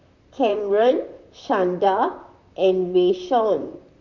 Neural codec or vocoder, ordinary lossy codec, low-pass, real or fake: vocoder, 44.1 kHz, 128 mel bands, Pupu-Vocoder; none; 7.2 kHz; fake